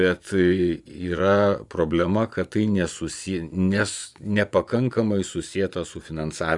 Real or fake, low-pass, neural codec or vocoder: fake; 10.8 kHz; vocoder, 24 kHz, 100 mel bands, Vocos